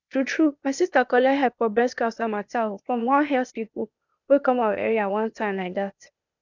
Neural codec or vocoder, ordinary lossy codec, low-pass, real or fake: codec, 16 kHz, 0.8 kbps, ZipCodec; none; 7.2 kHz; fake